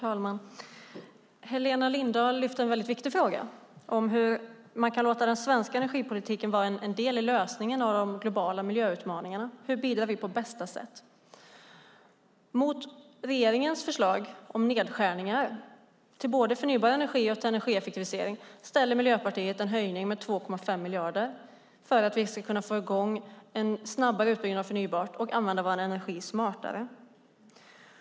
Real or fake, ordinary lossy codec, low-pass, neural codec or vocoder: real; none; none; none